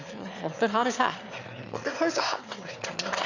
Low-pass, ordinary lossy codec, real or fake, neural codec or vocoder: 7.2 kHz; none; fake; autoencoder, 22.05 kHz, a latent of 192 numbers a frame, VITS, trained on one speaker